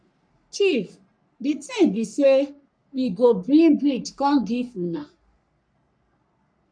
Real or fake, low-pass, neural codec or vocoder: fake; 9.9 kHz; codec, 44.1 kHz, 3.4 kbps, Pupu-Codec